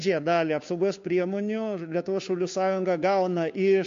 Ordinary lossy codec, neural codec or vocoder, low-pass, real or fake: MP3, 48 kbps; codec, 16 kHz, 2 kbps, FunCodec, trained on Chinese and English, 25 frames a second; 7.2 kHz; fake